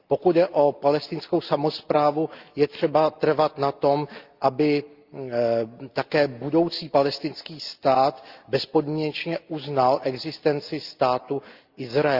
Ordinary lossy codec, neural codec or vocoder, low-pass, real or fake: Opus, 32 kbps; none; 5.4 kHz; real